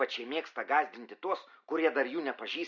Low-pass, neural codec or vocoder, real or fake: 7.2 kHz; none; real